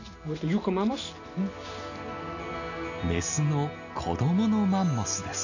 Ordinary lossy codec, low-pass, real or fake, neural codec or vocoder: none; 7.2 kHz; real; none